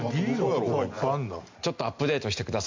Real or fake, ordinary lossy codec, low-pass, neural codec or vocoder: real; MP3, 48 kbps; 7.2 kHz; none